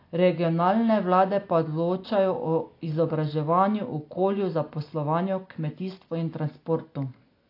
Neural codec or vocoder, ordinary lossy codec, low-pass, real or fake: none; AAC, 32 kbps; 5.4 kHz; real